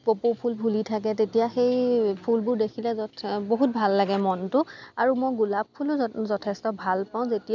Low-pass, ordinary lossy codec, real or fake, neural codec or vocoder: 7.2 kHz; none; real; none